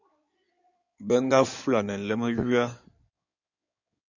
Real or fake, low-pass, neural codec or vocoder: fake; 7.2 kHz; codec, 16 kHz in and 24 kHz out, 2.2 kbps, FireRedTTS-2 codec